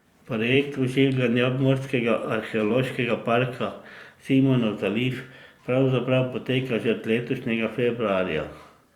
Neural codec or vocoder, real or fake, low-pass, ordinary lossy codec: vocoder, 44.1 kHz, 128 mel bands every 256 samples, BigVGAN v2; fake; 19.8 kHz; Opus, 64 kbps